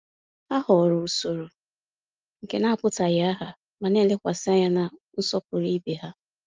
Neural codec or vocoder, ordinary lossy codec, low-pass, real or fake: none; Opus, 24 kbps; 7.2 kHz; real